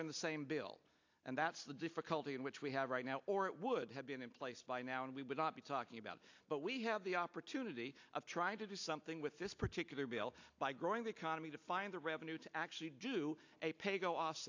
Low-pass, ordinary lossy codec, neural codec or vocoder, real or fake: 7.2 kHz; AAC, 48 kbps; none; real